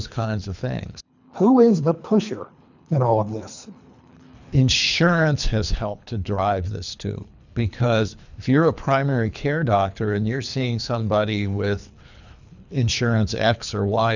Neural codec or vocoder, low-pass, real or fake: codec, 24 kHz, 3 kbps, HILCodec; 7.2 kHz; fake